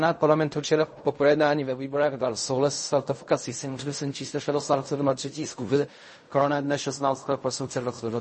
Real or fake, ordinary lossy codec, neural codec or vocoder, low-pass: fake; MP3, 32 kbps; codec, 16 kHz in and 24 kHz out, 0.4 kbps, LongCat-Audio-Codec, fine tuned four codebook decoder; 10.8 kHz